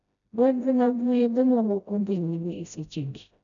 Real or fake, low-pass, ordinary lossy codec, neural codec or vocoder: fake; 7.2 kHz; none; codec, 16 kHz, 0.5 kbps, FreqCodec, smaller model